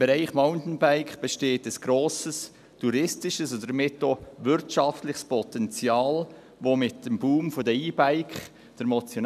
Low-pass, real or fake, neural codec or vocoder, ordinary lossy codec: 14.4 kHz; real; none; none